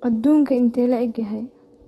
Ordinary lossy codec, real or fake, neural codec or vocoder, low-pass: AAC, 32 kbps; fake; autoencoder, 48 kHz, 128 numbers a frame, DAC-VAE, trained on Japanese speech; 19.8 kHz